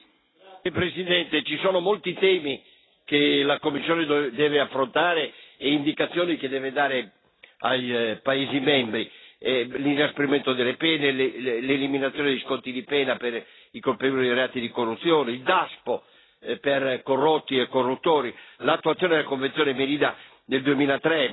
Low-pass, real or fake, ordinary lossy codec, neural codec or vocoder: 7.2 kHz; real; AAC, 16 kbps; none